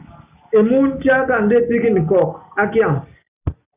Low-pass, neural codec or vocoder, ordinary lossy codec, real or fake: 3.6 kHz; none; Opus, 64 kbps; real